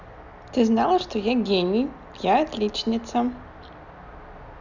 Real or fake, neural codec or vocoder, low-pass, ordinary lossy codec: real; none; 7.2 kHz; none